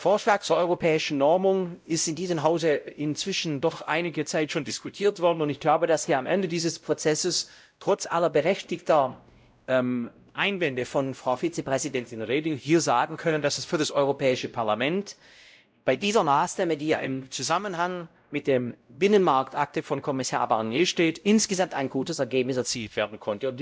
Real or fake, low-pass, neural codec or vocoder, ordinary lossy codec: fake; none; codec, 16 kHz, 0.5 kbps, X-Codec, WavLM features, trained on Multilingual LibriSpeech; none